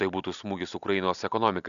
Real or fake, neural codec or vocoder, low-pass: real; none; 7.2 kHz